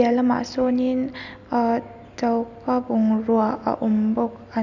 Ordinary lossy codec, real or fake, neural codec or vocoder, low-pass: none; real; none; 7.2 kHz